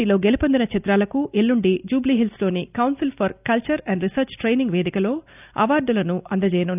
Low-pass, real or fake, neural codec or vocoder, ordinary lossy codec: 3.6 kHz; real; none; none